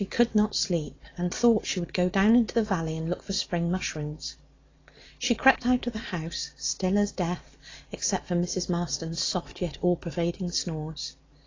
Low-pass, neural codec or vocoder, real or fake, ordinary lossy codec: 7.2 kHz; none; real; AAC, 32 kbps